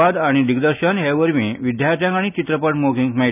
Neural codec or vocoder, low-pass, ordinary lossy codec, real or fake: none; 3.6 kHz; none; real